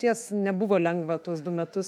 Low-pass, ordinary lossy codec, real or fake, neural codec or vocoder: 19.8 kHz; MP3, 96 kbps; fake; autoencoder, 48 kHz, 32 numbers a frame, DAC-VAE, trained on Japanese speech